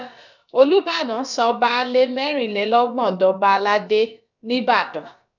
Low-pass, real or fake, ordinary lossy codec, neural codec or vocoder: 7.2 kHz; fake; none; codec, 16 kHz, about 1 kbps, DyCAST, with the encoder's durations